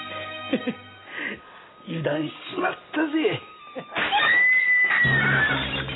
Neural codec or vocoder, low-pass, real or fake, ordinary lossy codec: none; 7.2 kHz; real; AAC, 16 kbps